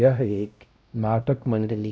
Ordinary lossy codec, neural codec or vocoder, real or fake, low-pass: none; codec, 16 kHz, 0.5 kbps, X-Codec, WavLM features, trained on Multilingual LibriSpeech; fake; none